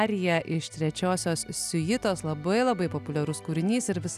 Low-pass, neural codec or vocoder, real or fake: 14.4 kHz; none; real